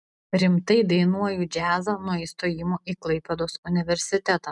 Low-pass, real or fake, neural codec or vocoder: 10.8 kHz; real; none